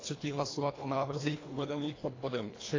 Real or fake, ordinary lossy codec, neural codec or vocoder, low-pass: fake; AAC, 32 kbps; codec, 24 kHz, 1.5 kbps, HILCodec; 7.2 kHz